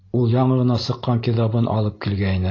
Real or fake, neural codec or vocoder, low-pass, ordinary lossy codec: real; none; 7.2 kHz; AAC, 32 kbps